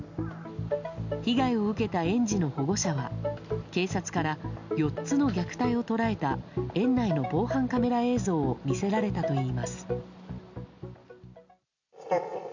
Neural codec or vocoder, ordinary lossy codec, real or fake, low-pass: none; none; real; 7.2 kHz